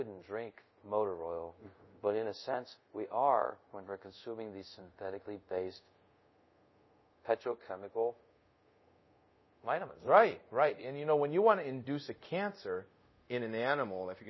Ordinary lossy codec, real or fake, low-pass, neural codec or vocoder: MP3, 24 kbps; fake; 7.2 kHz; codec, 24 kHz, 0.5 kbps, DualCodec